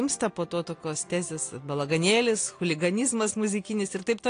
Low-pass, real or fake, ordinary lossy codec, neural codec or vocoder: 9.9 kHz; real; AAC, 48 kbps; none